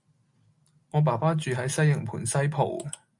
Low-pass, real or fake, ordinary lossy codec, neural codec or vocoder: 10.8 kHz; real; MP3, 64 kbps; none